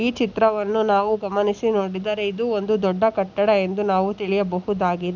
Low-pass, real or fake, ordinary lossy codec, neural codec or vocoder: 7.2 kHz; real; none; none